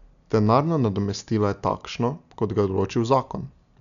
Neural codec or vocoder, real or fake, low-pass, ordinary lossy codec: none; real; 7.2 kHz; none